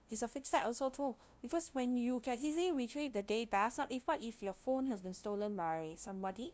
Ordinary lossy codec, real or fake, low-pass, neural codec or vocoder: none; fake; none; codec, 16 kHz, 0.5 kbps, FunCodec, trained on LibriTTS, 25 frames a second